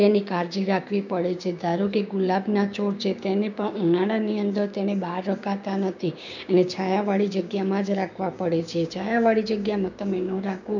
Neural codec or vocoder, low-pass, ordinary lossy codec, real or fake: codec, 16 kHz, 6 kbps, DAC; 7.2 kHz; none; fake